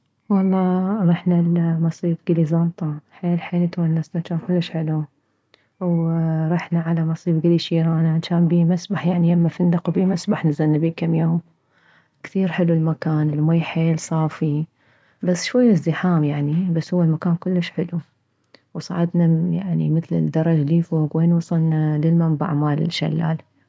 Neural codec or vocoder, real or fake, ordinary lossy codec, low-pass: none; real; none; none